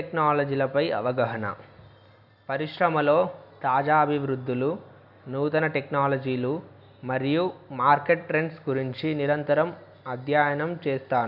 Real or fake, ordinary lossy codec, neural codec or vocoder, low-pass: real; none; none; 5.4 kHz